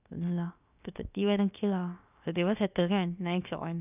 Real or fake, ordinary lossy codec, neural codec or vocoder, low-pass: fake; none; codec, 16 kHz, about 1 kbps, DyCAST, with the encoder's durations; 3.6 kHz